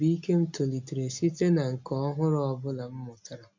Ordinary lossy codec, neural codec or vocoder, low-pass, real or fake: MP3, 64 kbps; none; 7.2 kHz; real